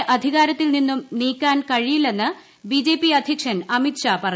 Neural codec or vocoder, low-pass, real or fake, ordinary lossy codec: none; none; real; none